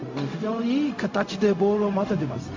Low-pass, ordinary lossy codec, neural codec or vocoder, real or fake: 7.2 kHz; MP3, 48 kbps; codec, 16 kHz, 0.4 kbps, LongCat-Audio-Codec; fake